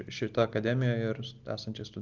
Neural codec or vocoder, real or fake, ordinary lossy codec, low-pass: none; real; Opus, 32 kbps; 7.2 kHz